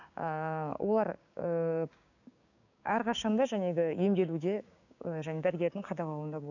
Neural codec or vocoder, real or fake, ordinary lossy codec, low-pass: codec, 16 kHz, 6 kbps, DAC; fake; none; 7.2 kHz